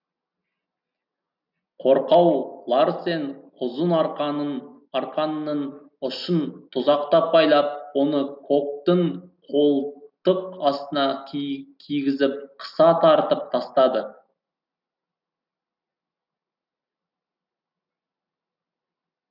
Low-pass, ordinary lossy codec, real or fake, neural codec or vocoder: 5.4 kHz; none; real; none